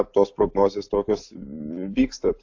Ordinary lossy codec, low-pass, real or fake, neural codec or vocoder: AAC, 48 kbps; 7.2 kHz; real; none